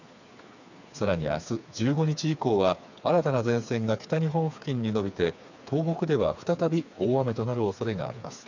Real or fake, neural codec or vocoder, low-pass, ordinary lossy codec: fake; codec, 16 kHz, 4 kbps, FreqCodec, smaller model; 7.2 kHz; none